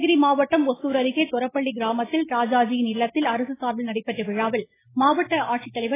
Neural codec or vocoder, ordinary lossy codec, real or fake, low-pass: none; AAC, 16 kbps; real; 3.6 kHz